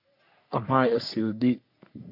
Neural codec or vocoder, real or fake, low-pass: codec, 44.1 kHz, 3.4 kbps, Pupu-Codec; fake; 5.4 kHz